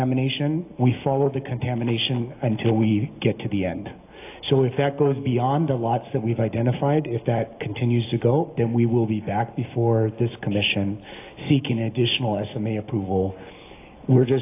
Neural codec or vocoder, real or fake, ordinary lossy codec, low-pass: none; real; AAC, 24 kbps; 3.6 kHz